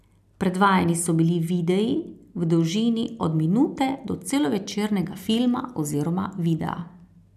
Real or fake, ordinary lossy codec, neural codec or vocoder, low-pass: real; none; none; 14.4 kHz